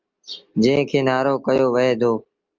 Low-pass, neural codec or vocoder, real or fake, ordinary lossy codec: 7.2 kHz; none; real; Opus, 24 kbps